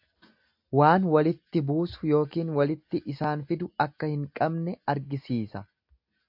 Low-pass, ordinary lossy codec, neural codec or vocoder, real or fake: 5.4 kHz; AAC, 48 kbps; none; real